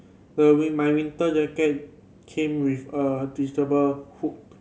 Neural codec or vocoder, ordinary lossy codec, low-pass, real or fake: none; none; none; real